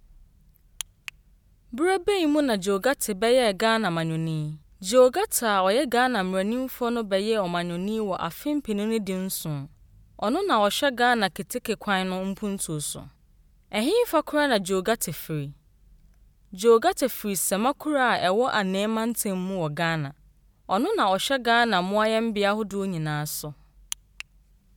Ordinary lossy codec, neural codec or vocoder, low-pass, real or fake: none; none; none; real